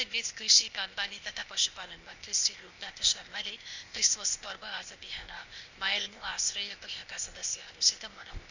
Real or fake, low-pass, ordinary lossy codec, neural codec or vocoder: fake; 7.2 kHz; Opus, 64 kbps; codec, 16 kHz, 0.8 kbps, ZipCodec